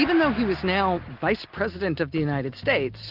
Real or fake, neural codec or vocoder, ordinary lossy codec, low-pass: real; none; Opus, 24 kbps; 5.4 kHz